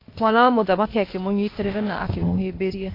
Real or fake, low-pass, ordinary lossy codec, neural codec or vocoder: fake; 5.4 kHz; AAC, 32 kbps; codec, 16 kHz, 0.8 kbps, ZipCodec